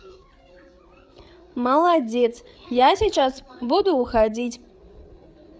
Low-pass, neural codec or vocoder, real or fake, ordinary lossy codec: none; codec, 16 kHz, 8 kbps, FreqCodec, larger model; fake; none